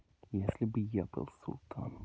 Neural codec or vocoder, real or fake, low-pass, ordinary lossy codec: none; real; none; none